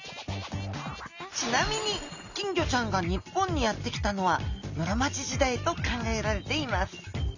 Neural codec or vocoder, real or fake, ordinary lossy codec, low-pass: none; real; none; 7.2 kHz